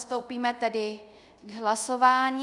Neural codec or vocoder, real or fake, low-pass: codec, 24 kHz, 0.5 kbps, DualCodec; fake; 10.8 kHz